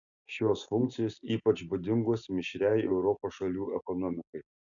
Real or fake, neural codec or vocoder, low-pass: real; none; 7.2 kHz